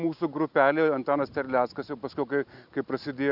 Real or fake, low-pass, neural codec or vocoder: real; 5.4 kHz; none